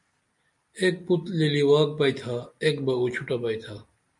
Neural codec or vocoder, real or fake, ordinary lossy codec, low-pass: none; real; MP3, 64 kbps; 10.8 kHz